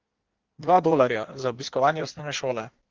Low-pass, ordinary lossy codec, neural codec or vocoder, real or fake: 7.2 kHz; Opus, 16 kbps; codec, 16 kHz in and 24 kHz out, 1.1 kbps, FireRedTTS-2 codec; fake